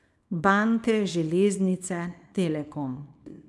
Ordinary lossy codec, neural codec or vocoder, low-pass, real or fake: none; codec, 24 kHz, 0.9 kbps, WavTokenizer, small release; none; fake